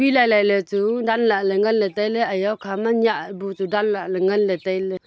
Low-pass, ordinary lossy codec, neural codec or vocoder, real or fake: none; none; none; real